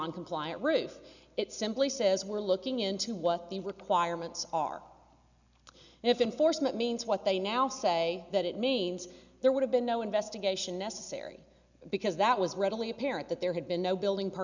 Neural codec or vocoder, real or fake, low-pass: none; real; 7.2 kHz